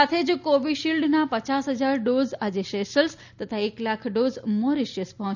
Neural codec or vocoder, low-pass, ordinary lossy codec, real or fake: none; 7.2 kHz; none; real